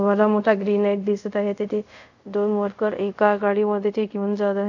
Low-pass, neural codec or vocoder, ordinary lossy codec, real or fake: 7.2 kHz; codec, 24 kHz, 0.5 kbps, DualCodec; none; fake